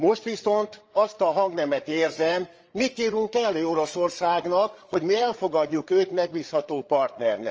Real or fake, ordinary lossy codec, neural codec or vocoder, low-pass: fake; Opus, 24 kbps; codec, 16 kHz, 8 kbps, FreqCodec, larger model; 7.2 kHz